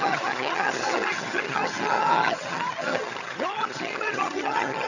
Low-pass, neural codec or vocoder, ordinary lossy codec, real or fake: 7.2 kHz; vocoder, 22.05 kHz, 80 mel bands, HiFi-GAN; none; fake